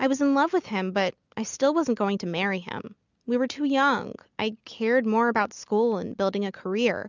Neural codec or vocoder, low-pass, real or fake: none; 7.2 kHz; real